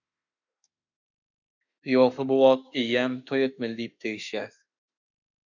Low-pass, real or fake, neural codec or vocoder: 7.2 kHz; fake; autoencoder, 48 kHz, 32 numbers a frame, DAC-VAE, trained on Japanese speech